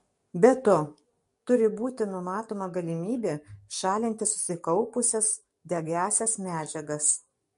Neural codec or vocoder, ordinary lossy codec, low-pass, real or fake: codec, 44.1 kHz, 7.8 kbps, DAC; MP3, 48 kbps; 14.4 kHz; fake